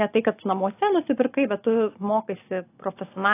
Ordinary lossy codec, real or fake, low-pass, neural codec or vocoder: AAC, 24 kbps; real; 3.6 kHz; none